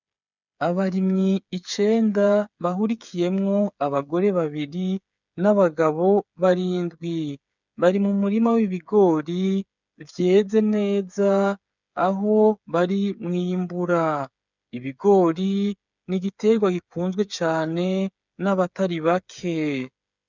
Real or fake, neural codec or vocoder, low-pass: fake; codec, 16 kHz, 8 kbps, FreqCodec, smaller model; 7.2 kHz